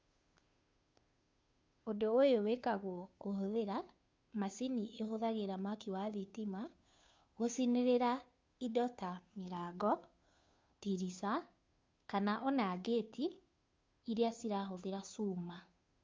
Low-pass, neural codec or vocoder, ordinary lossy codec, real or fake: none; codec, 16 kHz, 2 kbps, FunCodec, trained on Chinese and English, 25 frames a second; none; fake